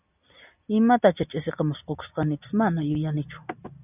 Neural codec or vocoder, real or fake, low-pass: none; real; 3.6 kHz